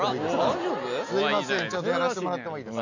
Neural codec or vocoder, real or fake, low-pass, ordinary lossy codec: none; real; 7.2 kHz; none